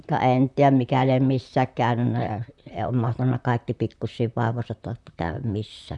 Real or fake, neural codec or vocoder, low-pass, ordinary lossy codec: fake; vocoder, 44.1 kHz, 128 mel bands, Pupu-Vocoder; 10.8 kHz; none